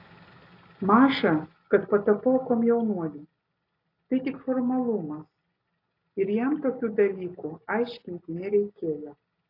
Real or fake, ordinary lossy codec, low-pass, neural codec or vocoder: real; AAC, 32 kbps; 5.4 kHz; none